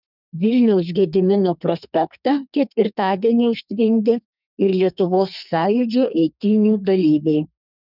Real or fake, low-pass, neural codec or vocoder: fake; 5.4 kHz; codec, 32 kHz, 1.9 kbps, SNAC